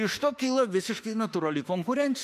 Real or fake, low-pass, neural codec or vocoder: fake; 14.4 kHz; autoencoder, 48 kHz, 32 numbers a frame, DAC-VAE, trained on Japanese speech